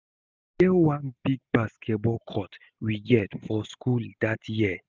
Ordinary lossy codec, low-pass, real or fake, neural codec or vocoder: none; none; real; none